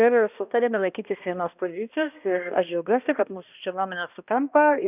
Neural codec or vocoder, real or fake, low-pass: codec, 16 kHz, 1 kbps, X-Codec, HuBERT features, trained on balanced general audio; fake; 3.6 kHz